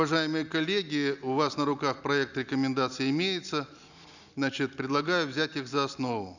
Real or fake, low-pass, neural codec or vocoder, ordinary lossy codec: real; 7.2 kHz; none; none